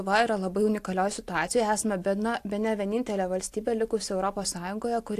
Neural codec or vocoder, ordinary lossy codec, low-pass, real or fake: none; AAC, 64 kbps; 14.4 kHz; real